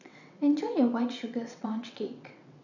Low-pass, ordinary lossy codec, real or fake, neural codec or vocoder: 7.2 kHz; none; fake; vocoder, 22.05 kHz, 80 mel bands, WaveNeXt